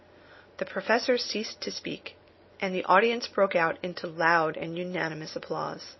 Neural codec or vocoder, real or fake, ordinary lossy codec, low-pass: none; real; MP3, 24 kbps; 7.2 kHz